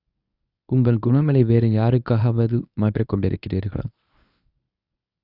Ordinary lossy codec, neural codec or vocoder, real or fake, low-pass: none; codec, 24 kHz, 0.9 kbps, WavTokenizer, medium speech release version 1; fake; 5.4 kHz